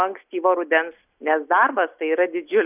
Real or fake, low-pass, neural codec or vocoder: real; 3.6 kHz; none